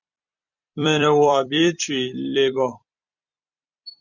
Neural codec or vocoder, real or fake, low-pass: vocoder, 44.1 kHz, 128 mel bands every 256 samples, BigVGAN v2; fake; 7.2 kHz